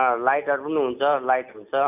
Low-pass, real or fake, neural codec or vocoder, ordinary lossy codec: 3.6 kHz; real; none; none